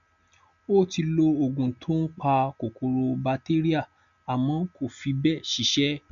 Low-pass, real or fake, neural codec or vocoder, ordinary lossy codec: 7.2 kHz; real; none; none